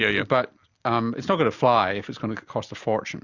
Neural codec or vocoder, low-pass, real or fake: none; 7.2 kHz; real